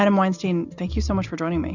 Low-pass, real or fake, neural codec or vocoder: 7.2 kHz; real; none